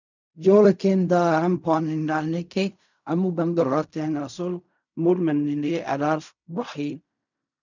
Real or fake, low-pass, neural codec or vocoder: fake; 7.2 kHz; codec, 16 kHz in and 24 kHz out, 0.4 kbps, LongCat-Audio-Codec, fine tuned four codebook decoder